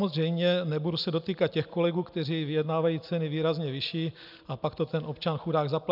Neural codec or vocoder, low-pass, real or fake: none; 5.4 kHz; real